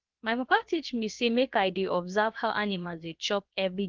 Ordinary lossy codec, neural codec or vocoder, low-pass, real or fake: Opus, 32 kbps; codec, 16 kHz, about 1 kbps, DyCAST, with the encoder's durations; 7.2 kHz; fake